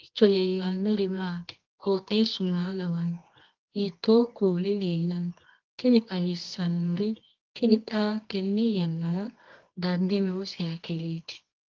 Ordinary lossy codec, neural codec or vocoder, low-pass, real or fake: Opus, 32 kbps; codec, 24 kHz, 0.9 kbps, WavTokenizer, medium music audio release; 7.2 kHz; fake